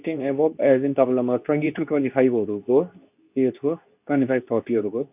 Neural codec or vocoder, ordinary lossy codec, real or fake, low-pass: codec, 24 kHz, 0.9 kbps, WavTokenizer, medium speech release version 2; none; fake; 3.6 kHz